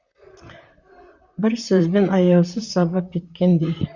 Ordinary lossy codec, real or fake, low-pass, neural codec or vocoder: Opus, 64 kbps; fake; 7.2 kHz; vocoder, 44.1 kHz, 128 mel bands, Pupu-Vocoder